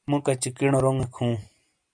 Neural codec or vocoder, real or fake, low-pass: none; real; 9.9 kHz